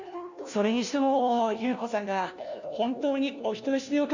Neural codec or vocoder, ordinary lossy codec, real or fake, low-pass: codec, 16 kHz, 1 kbps, FunCodec, trained on LibriTTS, 50 frames a second; none; fake; 7.2 kHz